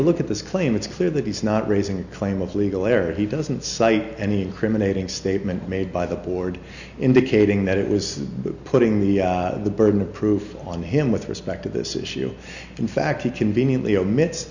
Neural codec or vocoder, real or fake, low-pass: none; real; 7.2 kHz